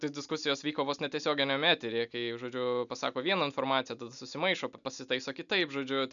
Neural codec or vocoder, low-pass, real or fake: none; 7.2 kHz; real